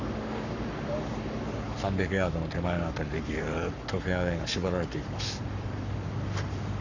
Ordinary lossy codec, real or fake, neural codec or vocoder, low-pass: none; fake; codec, 44.1 kHz, 7.8 kbps, Pupu-Codec; 7.2 kHz